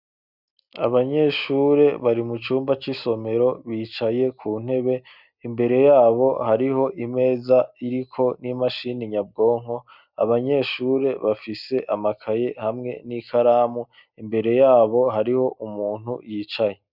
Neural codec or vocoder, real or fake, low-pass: none; real; 5.4 kHz